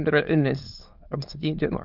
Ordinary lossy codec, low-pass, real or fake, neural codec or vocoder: Opus, 32 kbps; 5.4 kHz; fake; autoencoder, 22.05 kHz, a latent of 192 numbers a frame, VITS, trained on many speakers